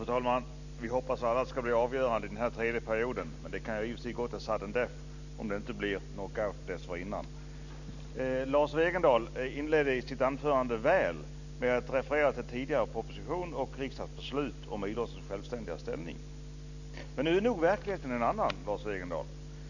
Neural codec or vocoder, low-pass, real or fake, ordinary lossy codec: none; 7.2 kHz; real; none